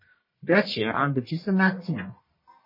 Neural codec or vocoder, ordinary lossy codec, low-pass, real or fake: codec, 44.1 kHz, 1.7 kbps, Pupu-Codec; MP3, 24 kbps; 5.4 kHz; fake